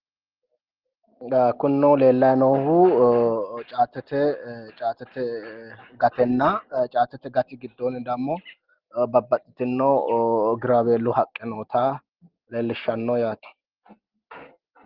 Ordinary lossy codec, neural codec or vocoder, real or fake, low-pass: Opus, 16 kbps; none; real; 5.4 kHz